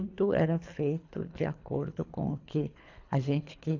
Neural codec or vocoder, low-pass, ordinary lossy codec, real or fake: codec, 24 kHz, 3 kbps, HILCodec; 7.2 kHz; AAC, 48 kbps; fake